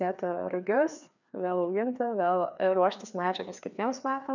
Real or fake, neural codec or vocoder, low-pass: fake; codec, 16 kHz, 2 kbps, FreqCodec, larger model; 7.2 kHz